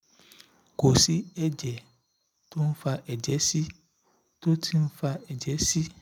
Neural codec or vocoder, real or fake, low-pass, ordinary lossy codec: none; real; 19.8 kHz; none